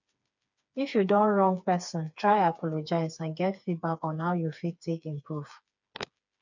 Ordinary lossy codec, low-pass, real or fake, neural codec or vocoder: none; 7.2 kHz; fake; codec, 16 kHz, 4 kbps, FreqCodec, smaller model